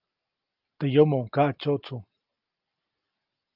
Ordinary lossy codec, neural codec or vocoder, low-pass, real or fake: Opus, 24 kbps; none; 5.4 kHz; real